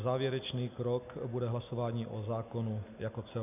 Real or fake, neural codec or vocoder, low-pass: real; none; 3.6 kHz